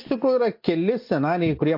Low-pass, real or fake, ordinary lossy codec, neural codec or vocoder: 5.4 kHz; real; MP3, 32 kbps; none